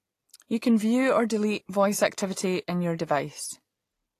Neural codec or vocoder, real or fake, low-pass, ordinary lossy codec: none; real; 14.4 kHz; AAC, 48 kbps